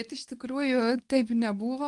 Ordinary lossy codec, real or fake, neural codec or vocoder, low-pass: Opus, 32 kbps; fake; codec, 24 kHz, 0.9 kbps, WavTokenizer, medium speech release version 2; 10.8 kHz